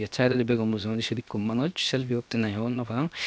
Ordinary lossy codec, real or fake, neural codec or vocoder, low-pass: none; fake; codec, 16 kHz, 0.7 kbps, FocalCodec; none